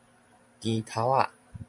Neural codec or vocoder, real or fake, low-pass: vocoder, 44.1 kHz, 128 mel bands every 512 samples, BigVGAN v2; fake; 10.8 kHz